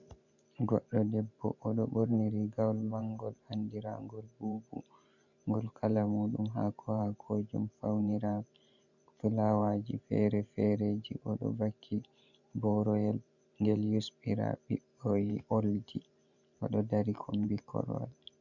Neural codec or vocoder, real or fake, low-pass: vocoder, 44.1 kHz, 128 mel bands every 512 samples, BigVGAN v2; fake; 7.2 kHz